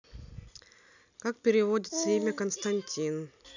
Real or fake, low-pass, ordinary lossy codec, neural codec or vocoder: real; 7.2 kHz; none; none